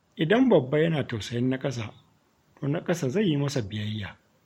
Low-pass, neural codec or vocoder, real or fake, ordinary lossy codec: 19.8 kHz; vocoder, 44.1 kHz, 128 mel bands every 512 samples, BigVGAN v2; fake; MP3, 64 kbps